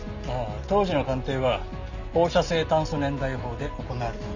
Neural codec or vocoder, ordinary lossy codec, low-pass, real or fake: none; none; 7.2 kHz; real